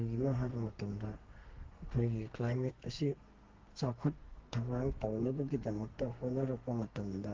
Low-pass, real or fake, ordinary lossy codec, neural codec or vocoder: 7.2 kHz; fake; Opus, 16 kbps; codec, 32 kHz, 1.9 kbps, SNAC